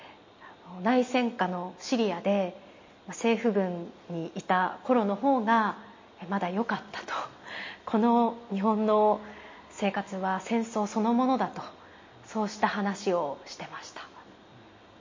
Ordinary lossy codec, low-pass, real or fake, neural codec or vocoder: MP3, 32 kbps; 7.2 kHz; real; none